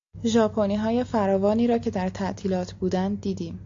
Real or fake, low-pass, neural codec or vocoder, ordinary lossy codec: real; 7.2 kHz; none; AAC, 48 kbps